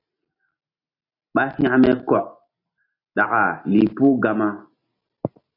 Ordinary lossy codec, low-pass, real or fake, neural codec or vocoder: AAC, 32 kbps; 5.4 kHz; real; none